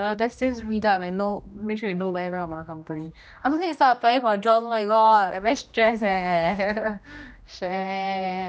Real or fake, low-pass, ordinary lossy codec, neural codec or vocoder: fake; none; none; codec, 16 kHz, 1 kbps, X-Codec, HuBERT features, trained on general audio